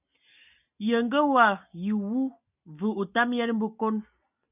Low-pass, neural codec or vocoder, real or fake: 3.6 kHz; none; real